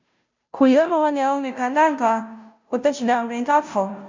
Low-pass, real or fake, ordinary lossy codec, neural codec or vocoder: 7.2 kHz; fake; MP3, 64 kbps; codec, 16 kHz, 0.5 kbps, FunCodec, trained on Chinese and English, 25 frames a second